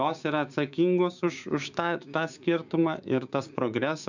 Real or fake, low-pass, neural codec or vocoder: fake; 7.2 kHz; codec, 16 kHz, 6 kbps, DAC